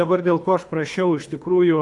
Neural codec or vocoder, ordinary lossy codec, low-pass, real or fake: codec, 24 kHz, 3 kbps, HILCodec; AAC, 64 kbps; 10.8 kHz; fake